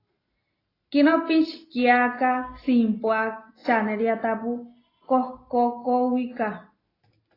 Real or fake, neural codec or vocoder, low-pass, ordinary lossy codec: real; none; 5.4 kHz; AAC, 24 kbps